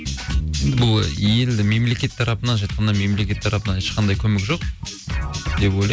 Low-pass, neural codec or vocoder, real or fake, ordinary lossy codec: none; none; real; none